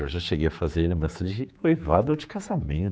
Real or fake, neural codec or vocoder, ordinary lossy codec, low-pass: fake; codec, 16 kHz, 4 kbps, X-Codec, HuBERT features, trained on general audio; none; none